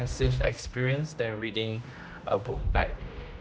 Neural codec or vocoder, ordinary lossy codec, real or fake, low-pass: codec, 16 kHz, 1 kbps, X-Codec, HuBERT features, trained on general audio; none; fake; none